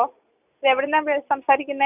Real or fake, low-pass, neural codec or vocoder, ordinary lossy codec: real; 3.6 kHz; none; none